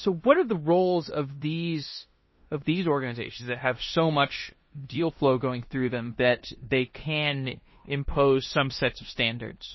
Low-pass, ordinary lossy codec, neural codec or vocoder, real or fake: 7.2 kHz; MP3, 24 kbps; codec, 16 kHz in and 24 kHz out, 0.9 kbps, LongCat-Audio-Codec, four codebook decoder; fake